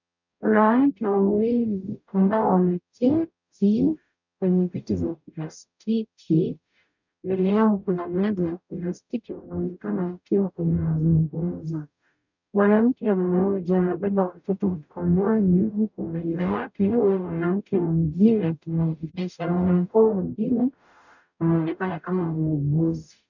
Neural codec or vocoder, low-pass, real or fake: codec, 44.1 kHz, 0.9 kbps, DAC; 7.2 kHz; fake